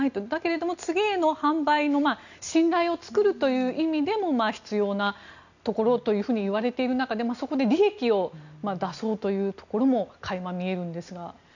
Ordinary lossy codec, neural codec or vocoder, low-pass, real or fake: none; none; 7.2 kHz; real